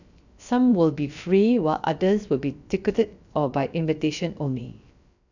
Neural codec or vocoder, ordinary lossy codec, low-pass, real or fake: codec, 16 kHz, about 1 kbps, DyCAST, with the encoder's durations; none; 7.2 kHz; fake